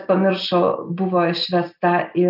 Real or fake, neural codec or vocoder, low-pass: real; none; 5.4 kHz